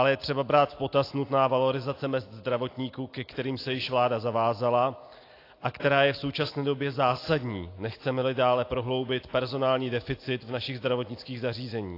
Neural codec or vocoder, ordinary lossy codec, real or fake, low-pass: none; AAC, 32 kbps; real; 5.4 kHz